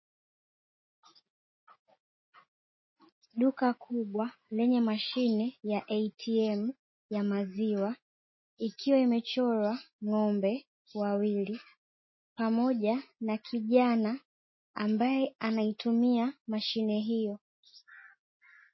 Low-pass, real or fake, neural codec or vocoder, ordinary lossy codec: 7.2 kHz; real; none; MP3, 24 kbps